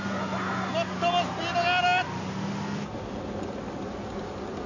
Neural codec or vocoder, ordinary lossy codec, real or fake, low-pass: none; none; real; 7.2 kHz